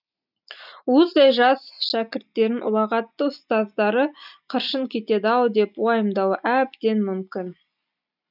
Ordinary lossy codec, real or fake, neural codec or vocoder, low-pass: none; real; none; 5.4 kHz